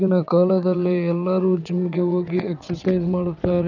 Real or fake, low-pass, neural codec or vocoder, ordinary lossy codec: fake; 7.2 kHz; vocoder, 22.05 kHz, 80 mel bands, WaveNeXt; none